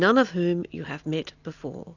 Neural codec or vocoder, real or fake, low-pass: none; real; 7.2 kHz